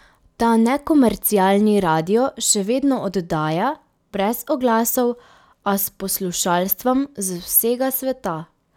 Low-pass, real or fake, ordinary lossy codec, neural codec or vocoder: 19.8 kHz; real; none; none